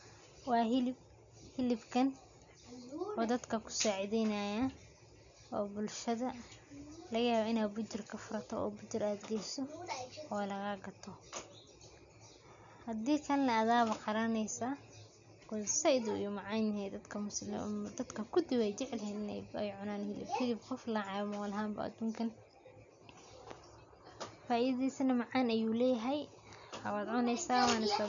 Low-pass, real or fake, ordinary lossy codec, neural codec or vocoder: 7.2 kHz; real; none; none